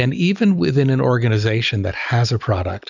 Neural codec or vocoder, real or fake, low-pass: none; real; 7.2 kHz